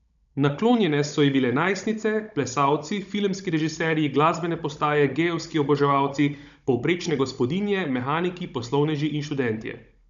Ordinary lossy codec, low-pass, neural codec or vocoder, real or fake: none; 7.2 kHz; codec, 16 kHz, 16 kbps, FunCodec, trained on Chinese and English, 50 frames a second; fake